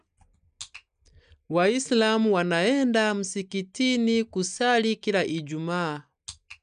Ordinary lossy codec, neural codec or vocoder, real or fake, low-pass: none; none; real; 9.9 kHz